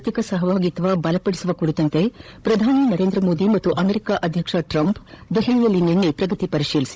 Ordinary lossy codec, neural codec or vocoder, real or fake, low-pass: none; codec, 16 kHz, 16 kbps, FunCodec, trained on Chinese and English, 50 frames a second; fake; none